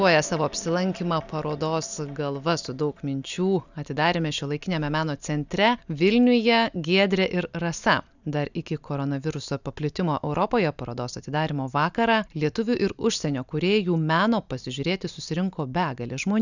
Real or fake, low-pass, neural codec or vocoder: real; 7.2 kHz; none